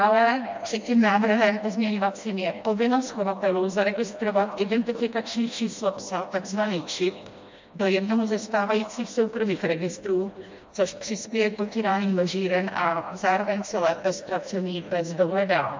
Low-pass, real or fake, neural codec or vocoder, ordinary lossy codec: 7.2 kHz; fake; codec, 16 kHz, 1 kbps, FreqCodec, smaller model; MP3, 48 kbps